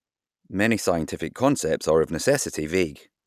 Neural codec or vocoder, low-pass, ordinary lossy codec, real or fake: none; 14.4 kHz; none; real